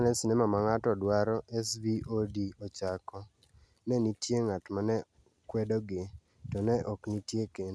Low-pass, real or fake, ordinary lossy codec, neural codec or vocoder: none; real; none; none